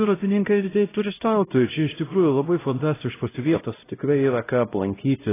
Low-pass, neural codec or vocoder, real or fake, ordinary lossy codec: 3.6 kHz; codec, 16 kHz, 0.5 kbps, X-Codec, HuBERT features, trained on LibriSpeech; fake; AAC, 16 kbps